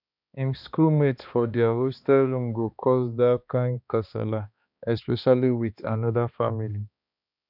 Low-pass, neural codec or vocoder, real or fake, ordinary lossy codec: 5.4 kHz; codec, 16 kHz, 2 kbps, X-Codec, HuBERT features, trained on balanced general audio; fake; none